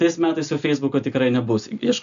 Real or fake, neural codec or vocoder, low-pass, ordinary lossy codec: real; none; 7.2 kHz; AAC, 96 kbps